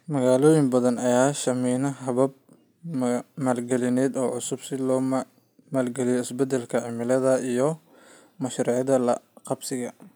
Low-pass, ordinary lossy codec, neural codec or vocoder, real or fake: none; none; none; real